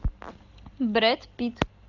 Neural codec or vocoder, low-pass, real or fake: none; 7.2 kHz; real